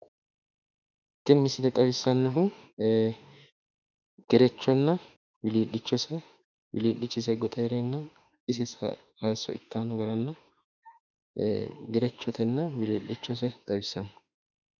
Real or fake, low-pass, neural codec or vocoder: fake; 7.2 kHz; autoencoder, 48 kHz, 32 numbers a frame, DAC-VAE, trained on Japanese speech